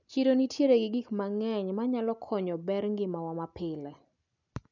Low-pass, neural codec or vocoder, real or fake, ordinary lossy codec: 7.2 kHz; none; real; none